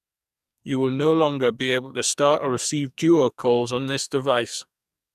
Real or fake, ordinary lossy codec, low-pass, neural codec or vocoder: fake; none; 14.4 kHz; codec, 44.1 kHz, 2.6 kbps, SNAC